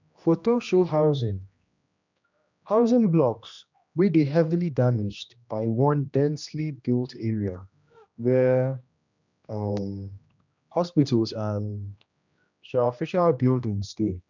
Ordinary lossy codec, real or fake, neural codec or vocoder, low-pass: none; fake; codec, 16 kHz, 1 kbps, X-Codec, HuBERT features, trained on general audio; 7.2 kHz